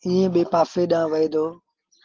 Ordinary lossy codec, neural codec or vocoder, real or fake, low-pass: Opus, 16 kbps; none; real; 7.2 kHz